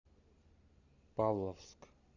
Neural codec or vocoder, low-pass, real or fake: none; 7.2 kHz; real